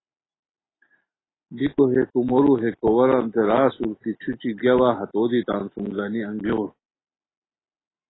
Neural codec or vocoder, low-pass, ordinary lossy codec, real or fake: none; 7.2 kHz; AAC, 16 kbps; real